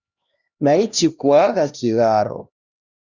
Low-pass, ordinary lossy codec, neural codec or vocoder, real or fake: 7.2 kHz; Opus, 64 kbps; codec, 16 kHz, 1 kbps, X-Codec, HuBERT features, trained on LibriSpeech; fake